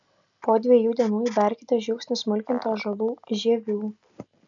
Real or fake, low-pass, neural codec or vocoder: real; 7.2 kHz; none